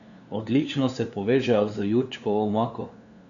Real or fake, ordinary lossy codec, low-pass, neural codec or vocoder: fake; MP3, 96 kbps; 7.2 kHz; codec, 16 kHz, 2 kbps, FunCodec, trained on LibriTTS, 25 frames a second